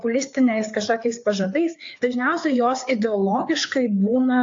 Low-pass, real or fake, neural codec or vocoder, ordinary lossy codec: 7.2 kHz; fake; codec, 16 kHz, 4 kbps, FreqCodec, larger model; AAC, 48 kbps